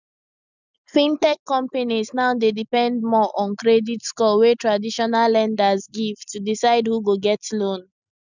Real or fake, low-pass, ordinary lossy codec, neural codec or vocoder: real; 7.2 kHz; none; none